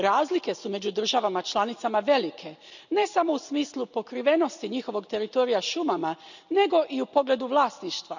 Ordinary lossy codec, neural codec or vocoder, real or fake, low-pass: none; none; real; 7.2 kHz